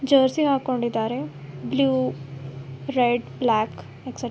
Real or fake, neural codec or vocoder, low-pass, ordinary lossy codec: real; none; none; none